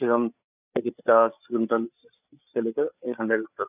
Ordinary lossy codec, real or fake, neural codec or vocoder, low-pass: none; fake; codec, 16 kHz, 8 kbps, FreqCodec, larger model; 3.6 kHz